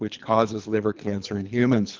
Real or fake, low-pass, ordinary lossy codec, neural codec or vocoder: fake; 7.2 kHz; Opus, 32 kbps; codec, 24 kHz, 3 kbps, HILCodec